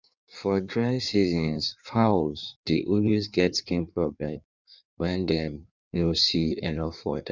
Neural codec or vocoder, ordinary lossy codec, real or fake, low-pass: codec, 16 kHz in and 24 kHz out, 1.1 kbps, FireRedTTS-2 codec; none; fake; 7.2 kHz